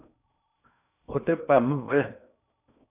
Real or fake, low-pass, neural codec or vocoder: fake; 3.6 kHz; codec, 16 kHz in and 24 kHz out, 0.6 kbps, FocalCodec, streaming, 4096 codes